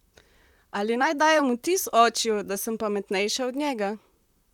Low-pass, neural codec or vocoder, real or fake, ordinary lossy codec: 19.8 kHz; vocoder, 44.1 kHz, 128 mel bands, Pupu-Vocoder; fake; none